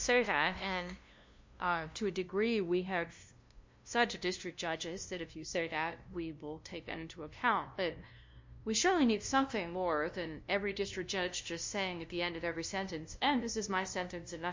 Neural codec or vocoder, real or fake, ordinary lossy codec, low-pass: codec, 16 kHz, 0.5 kbps, FunCodec, trained on LibriTTS, 25 frames a second; fake; MP3, 48 kbps; 7.2 kHz